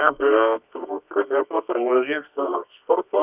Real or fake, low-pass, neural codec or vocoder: fake; 3.6 kHz; codec, 24 kHz, 0.9 kbps, WavTokenizer, medium music audio release